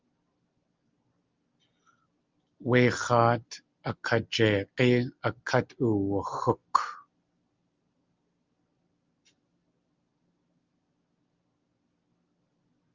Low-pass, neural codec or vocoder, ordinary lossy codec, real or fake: 7.2 kHz; none; Opus, 24 kbps; real